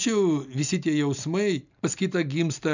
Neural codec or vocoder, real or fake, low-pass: none; real; 7.2 kHz